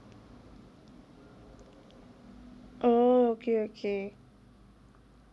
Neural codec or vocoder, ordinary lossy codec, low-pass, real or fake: none; none; none; real